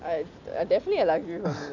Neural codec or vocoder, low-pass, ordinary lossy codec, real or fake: none; 7.2 kHz; none; real